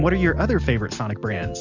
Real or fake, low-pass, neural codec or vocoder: real; 7.2 kHz; none